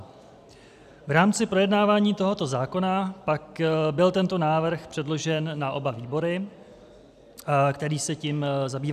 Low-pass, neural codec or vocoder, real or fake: 14.4 kHz; none; real